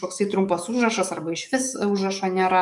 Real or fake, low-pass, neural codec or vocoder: fake; 10.8 kHz; codec, 44.1 kHz, 7.8 kbps, DAC